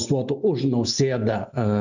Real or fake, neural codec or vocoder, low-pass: real; none; 7.2 kHz